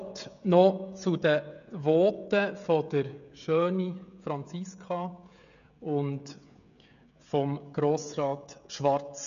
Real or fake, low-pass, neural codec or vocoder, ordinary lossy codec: fake; 7.2 kHz; codec, 16 kHz, 16 kbps, FreqCodec, smaller model; none